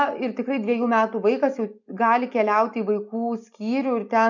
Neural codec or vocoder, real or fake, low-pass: none; real; 7.2 kHz